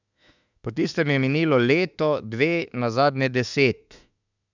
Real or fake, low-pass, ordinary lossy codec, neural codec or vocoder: fake; 7.2 kHz; none; autoencoder, 48 kHz, 32 numbers a frame, DAC-VAE, trained on Japanese speech